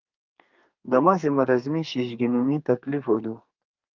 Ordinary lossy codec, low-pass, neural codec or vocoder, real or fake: Opus, 24 kbps; 7.2 kHz; codec, 32 kHz, 1.9 kbps, SNAC; fake